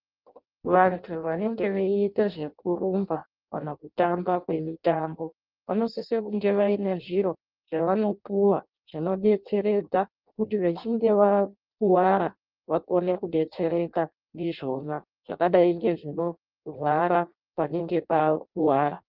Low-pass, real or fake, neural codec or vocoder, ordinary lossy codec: 5.4 kHz; fake; codec, 16 kHz in and 24 kHz out, 0.6 kbps, FireRedTTS-2 codec; Opus, 24 kbps